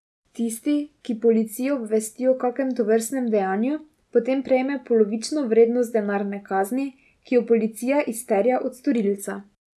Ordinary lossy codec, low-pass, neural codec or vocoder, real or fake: none; none; none; real